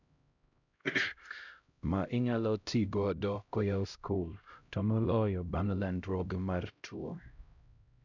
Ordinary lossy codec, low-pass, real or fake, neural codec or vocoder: none; 7.2 kHz; fake; codec, 16 kHz, 0.5 kbps, X-Codec, HuBERT features, trained on LibriSpeech